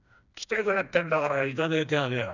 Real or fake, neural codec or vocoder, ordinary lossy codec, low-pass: fake; codec, 16 kHz, 2 kbps, FreqCodec, smaller model; none; 7.2 kHz